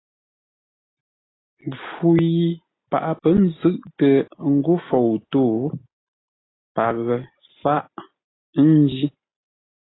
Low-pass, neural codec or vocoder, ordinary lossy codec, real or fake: 7.2 kHz; none; AAC, 16 kbps; real